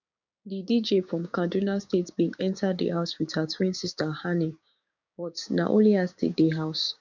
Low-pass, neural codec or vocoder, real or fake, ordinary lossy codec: 7.2 kHz; codec, 16 kHz, 6 kbps, DAC; fake; none